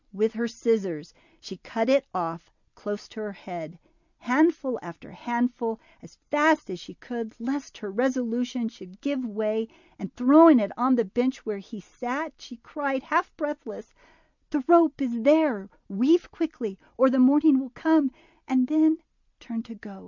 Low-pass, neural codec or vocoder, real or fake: 7.2 kHz; none; real